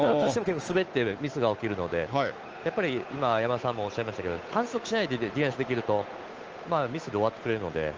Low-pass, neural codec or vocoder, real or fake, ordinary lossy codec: 7.2 kHz; codec, 16 kHz, 8 kbps, FunCodec, trained on Chinese and English, 25 frames a second; fake; Opus, 24 kbps